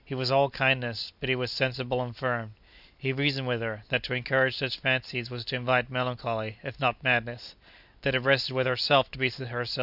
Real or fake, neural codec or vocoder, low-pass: real; none; 5.4 kHz